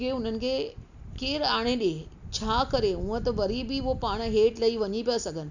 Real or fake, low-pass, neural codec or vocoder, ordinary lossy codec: real; 7.2 kHz; none; none